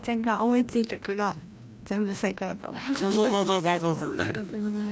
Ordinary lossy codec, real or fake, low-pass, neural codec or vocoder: none; fake; none; codec, 16 kHz, 1 kbps, FreqCodec, larger model